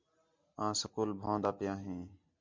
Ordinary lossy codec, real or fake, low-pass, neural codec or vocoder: MP3, 64 kbps; real; 7.2 kHz; none